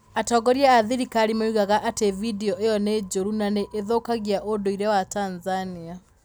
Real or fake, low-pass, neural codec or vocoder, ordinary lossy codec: real; none; none; none